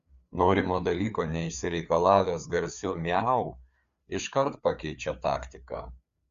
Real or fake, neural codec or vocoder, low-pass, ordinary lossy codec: fake; codec, 16 kHz, 4 kbps, FreqCodec, larger model; 7.2 kHz; Opus, 64 kbps